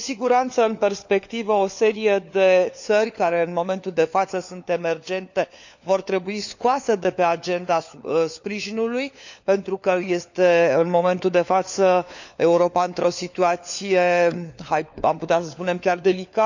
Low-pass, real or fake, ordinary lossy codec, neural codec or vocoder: 7.2 kHz; fake; none; codec, 16 kHz, 4 kbps, FunCodec, trained on LibriTTS, 50 frames a second